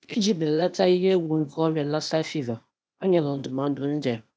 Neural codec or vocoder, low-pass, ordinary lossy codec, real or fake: codec, 16 kHz, 0.8 kbps, ZipCodec; none; none; fake